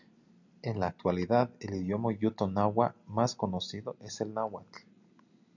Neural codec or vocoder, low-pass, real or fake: none; 7.2 kHz; real